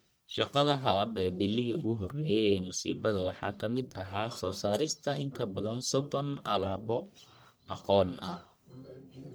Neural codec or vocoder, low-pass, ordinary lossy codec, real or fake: codec, 44.1 kHz, 1.7 kbps, Pupu-Codec; none; none; fake